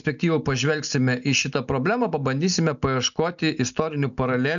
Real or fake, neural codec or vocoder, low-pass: real; none; 7.2 kHz